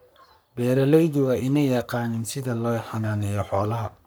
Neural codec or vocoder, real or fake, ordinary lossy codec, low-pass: codec, 44.1 kHz, 3.4 kbps, Pupu-Codec; fake; none; none